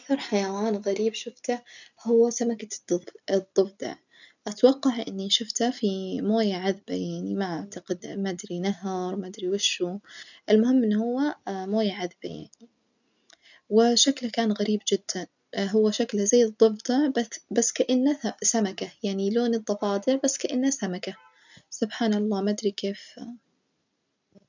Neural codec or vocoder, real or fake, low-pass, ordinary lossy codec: none; real; 7.2 kHz; none